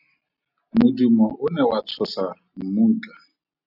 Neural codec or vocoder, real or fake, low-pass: none; real; 5.4 kHz